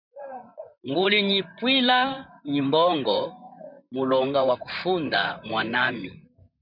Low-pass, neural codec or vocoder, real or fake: 5.4 kHz; vocoder, 44.1 kHz, 128 mel bands, Pupu-Vocoder; fake